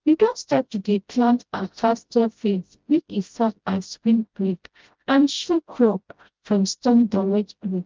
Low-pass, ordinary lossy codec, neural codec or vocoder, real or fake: 7.2 kHz; Opus, 32 kbps; codec, 16 kHz, 0.5 kbps, FreqCodec, smaller model; fake